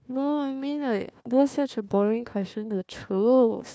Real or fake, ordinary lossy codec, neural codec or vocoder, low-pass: fake; none; codec, 16 kHz, 1 kbps, FunCodec, trained on Chinese and English, 50 frames a second; none